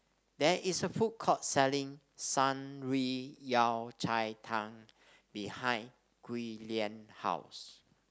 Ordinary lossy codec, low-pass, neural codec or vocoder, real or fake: none; none; none; real